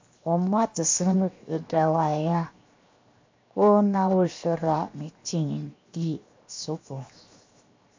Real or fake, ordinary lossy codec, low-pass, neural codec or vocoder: fake; MP3, 64 kbps; 7.2 kHz; codec, 16 kHz, 0.7 kbps, FocalCodec